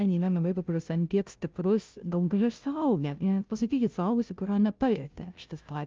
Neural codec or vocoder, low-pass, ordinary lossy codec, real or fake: codec, 16 kHz, 0.5 kbps, FunCodec, trained on Chinese and English, 25 frames a second; 7.2 kHz; Opus, 24 kbps; fake